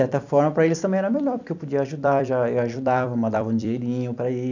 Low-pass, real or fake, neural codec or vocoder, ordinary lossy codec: 7.2 kHz; fake; vocoder, 44.1 kHz, 128 mel bands every 256 samples, BigVGAN v2; none